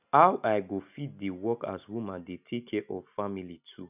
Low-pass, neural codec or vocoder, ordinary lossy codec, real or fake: 3.6 kHz; none; none; real